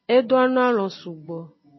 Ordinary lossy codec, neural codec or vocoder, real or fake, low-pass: MP3, 24 kbps; none; real; 7.2 kHz